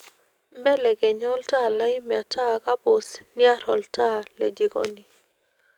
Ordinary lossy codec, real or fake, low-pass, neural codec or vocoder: none; fake; 19.8 kHz; codec, 44.1 kHz, 7.8 kbps, DAC